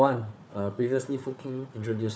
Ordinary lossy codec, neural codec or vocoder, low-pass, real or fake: none; codec, 16 kHz, 4 kbps, FunCodec, trained on Chinese and English, 50 frames a second; none; fake